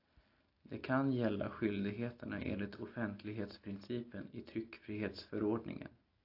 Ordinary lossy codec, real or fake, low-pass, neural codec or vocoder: AAC, 32 kbps; real; 5.4 kHz; none